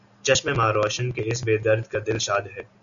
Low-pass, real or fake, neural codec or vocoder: 7.2 kHz; real; none